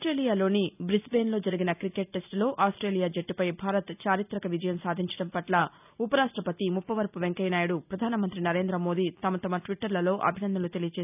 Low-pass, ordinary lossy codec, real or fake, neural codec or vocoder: 3.6 kHz; none; real; none